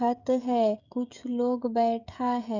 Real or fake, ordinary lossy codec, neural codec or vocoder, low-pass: fake; none; codec, 16 kHz, 16 kbps, FreqCodec, smaller model; 7.2 kHz